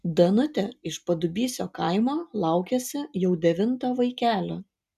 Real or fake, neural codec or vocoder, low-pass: real; none; 14.4 kHz